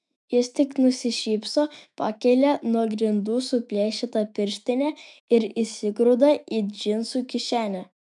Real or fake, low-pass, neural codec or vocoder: fake; 10.8 kHz; autoencoder, 48 kHz, 128 numbers a frame, DAC-VAE, trained on Japanese speech